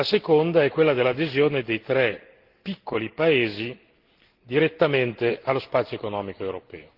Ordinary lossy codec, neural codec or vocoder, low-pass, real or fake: Opus, 16 kbps; none; 5.4 kHz; real